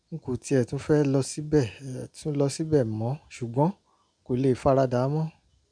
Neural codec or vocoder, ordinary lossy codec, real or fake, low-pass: none; none; real; 9.9 kHz